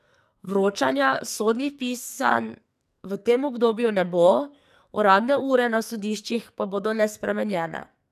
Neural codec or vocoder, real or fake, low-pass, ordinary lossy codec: codec, 44.1 kHz, 2.6 kbps, SNAC; fake; 14.4 kHz; none